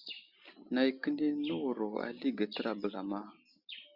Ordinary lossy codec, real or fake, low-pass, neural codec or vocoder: Opus, 64 kbps; real; 5.4 kHz; none